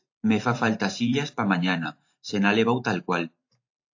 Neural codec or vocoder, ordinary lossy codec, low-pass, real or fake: vocoder, 44.1 kHz, 128 mel bands every 512 samples, BigVGAN v2; AAC, 48 kbps; 7.2 kHz; fake